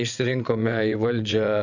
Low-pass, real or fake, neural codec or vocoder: 7.2 kHz; fake; vocoder, 22.05 kHz, 80 mel bands, WaveNeXt